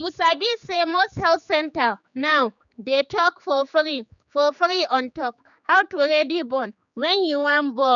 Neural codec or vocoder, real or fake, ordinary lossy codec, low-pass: codec, 16 kHz, 4 kbps, X-Codec, HuBERT features, trained on general audio; fake; none; 7.2 kHz